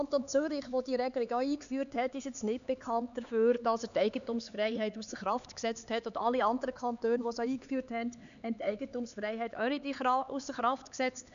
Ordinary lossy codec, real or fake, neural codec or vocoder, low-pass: none; fake; codec, 16 kHz, 4 kbps, X-Codec, HuBERT features, trained on LibriSpeech; 7.2 kHz